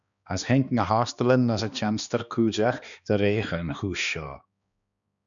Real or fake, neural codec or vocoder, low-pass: fake; codec, 16 kHz, 2 kbps, X-Codec, HuBERT features, trained on balanced general audio; 7.2 kHz